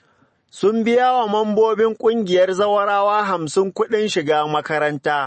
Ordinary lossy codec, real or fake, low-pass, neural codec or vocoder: MP3, 32 kbps; real; 10.8 kHz; none